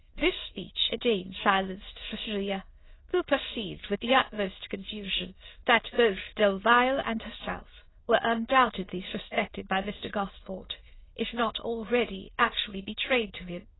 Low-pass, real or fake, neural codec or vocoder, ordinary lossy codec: 7.2 kHz; fake; autoencoder, 22.05 kHz, a latent of 192 numbers a frame, VITS, trained on many speakers; AAC, 16 kbps